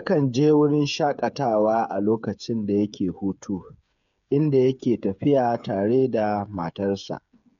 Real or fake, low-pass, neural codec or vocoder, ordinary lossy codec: fake; 7.2 kHz; codec, 16 kHz, 8 kbps, FreqCodec, smaller model; none